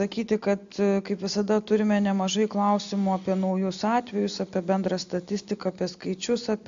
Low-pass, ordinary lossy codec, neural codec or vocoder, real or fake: 7.2 kHz; AAC, 64 kbps; none; real